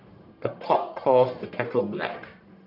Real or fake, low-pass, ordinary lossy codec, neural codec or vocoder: fake; 5.4 kHz; none; codec, 44.1 kHz, 1.7 kbps, Pupu-Codec